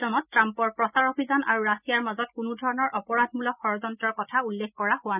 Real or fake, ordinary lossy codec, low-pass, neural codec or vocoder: real; none; 3.6 kHz; none